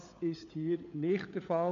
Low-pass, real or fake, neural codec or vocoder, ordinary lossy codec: 7.2 kHz; fake; codec, 16 kHz, 16 kbps, FunCodec, trained on LibriTTS, 50 frames a second; AAC, 64 kbps